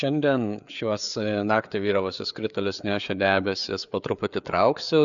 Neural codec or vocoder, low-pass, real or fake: codec, 16 kHz, 4 kbps, FreqCodec, larger model; 7.2 kHz; fake